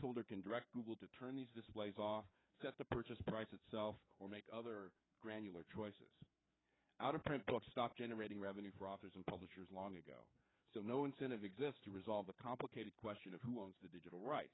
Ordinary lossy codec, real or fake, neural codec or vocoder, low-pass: AAC, 16 kbps; fake; codec, 16 kHz, 4 kbps, FreqCodec, larger model; 7.2 kHz